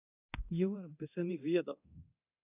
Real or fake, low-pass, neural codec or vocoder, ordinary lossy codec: fake; 3.6 kHz; codec, 24 kHz, 0.9 kbps, DualCodec; AAC, 24 kbps